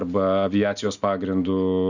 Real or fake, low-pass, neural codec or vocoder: real; 7.2 kHz; none